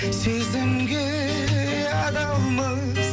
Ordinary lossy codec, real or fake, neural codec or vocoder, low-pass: none; real; none; none